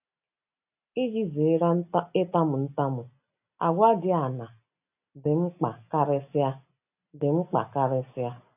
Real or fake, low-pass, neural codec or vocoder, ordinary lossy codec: real; 3.6 kHz; none; AAC, 32 kbps